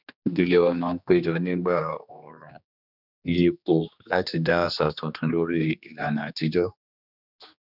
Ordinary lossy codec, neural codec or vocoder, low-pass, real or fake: none; codec, 16 kHz, 2 kbps, X-Codec, HuBERT features, trained on general audio; 5.4 kHz; fake